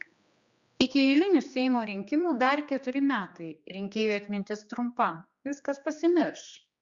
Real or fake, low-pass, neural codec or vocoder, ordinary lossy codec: fake; 7.2 kHz; codec, 16 kHz, 2 kbps, X-Codec, HuBERT features, trained on general audio; Opus, 64 kbps